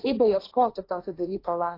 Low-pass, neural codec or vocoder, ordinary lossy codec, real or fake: 5.4 kHz; codec, 16 kHz, 1.1 kbps, Voila-Tokenizer; AAC, 32 kbps; fake